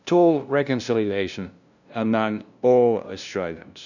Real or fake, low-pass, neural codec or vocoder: fake; 7.2 kHz; codec, 16 kHz, 0.5 kbps, FunCodec, trained on LibriTTS, 25 frames a second